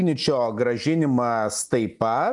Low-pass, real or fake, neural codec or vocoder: 10.8 kHz; real; none